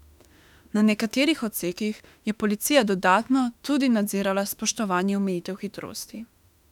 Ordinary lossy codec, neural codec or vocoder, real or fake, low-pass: none; autoencoder, 48 kHz, 32 numbers a frame, DAC-VAE, trained on Japanese speech; fake; 19.8 kHz